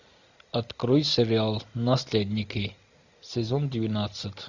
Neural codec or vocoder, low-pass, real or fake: none; 7.2 kHz; real